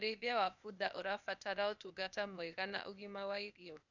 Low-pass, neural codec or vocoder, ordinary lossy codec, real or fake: 7.2 kHz; codec, 16 kHz, 0.8 kbps, ZipCodec; none; fake